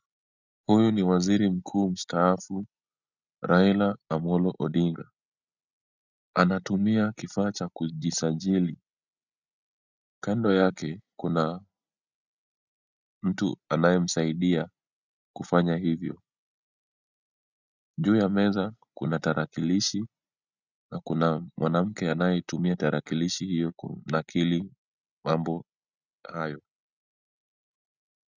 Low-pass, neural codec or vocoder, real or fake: 7.2 kHz; none; real